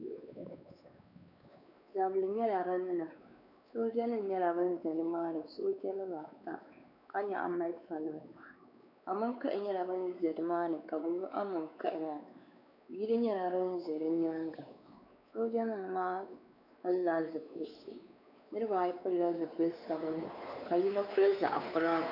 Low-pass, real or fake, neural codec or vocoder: 5.4 kHz; fake; codec, 16 kHz, 4 kbps, X-Codec, WavLM features, trained on Multilingual LibriSpeech